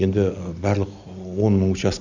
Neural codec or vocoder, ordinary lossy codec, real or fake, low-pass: none; none; real; 7.2 kHz